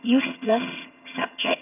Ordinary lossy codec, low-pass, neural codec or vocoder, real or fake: none; 3.6 kHz; vocoder, 22.05 kHz, 80 mel bands, HiFi-GAN; fake